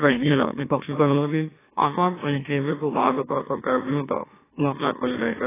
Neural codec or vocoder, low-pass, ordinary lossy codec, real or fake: autoencoder, 44.1 kHz, a latent of 192 numbers a frame, MeloTTS; 3.6 kHz; AAC, 16 kbps; fake